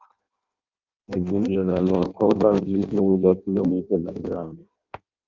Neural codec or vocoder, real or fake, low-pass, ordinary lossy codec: codec, 16 kHz in and 24 kHz out, 0.6 kbps, FireRedTTS-2 codec; fake; 7.2 kHz; Opus, 24 kbps